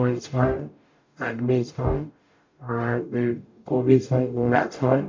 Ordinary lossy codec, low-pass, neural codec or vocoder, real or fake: MP3, 48 kbps; 7.2 kHz; codec, 44.1 kHz, 0.9 kbps, DAC; fake